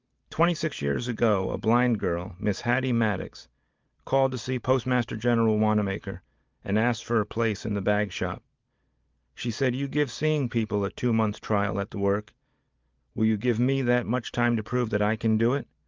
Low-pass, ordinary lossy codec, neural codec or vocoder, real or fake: 7.2 kHz; Opus, 24 kbps; none; real